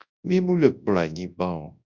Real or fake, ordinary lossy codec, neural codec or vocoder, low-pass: fake; Opus, 64 kbps; codec, 24 kHz, 0.9 kbps, WavTokenizer, large speech release; 7.2 kHz